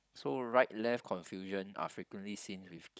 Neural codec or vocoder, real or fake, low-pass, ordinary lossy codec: codec, 16 kHz, 16 kbps, FunCodec, trained on Chinese and English, 50 frames a second; fake; none; none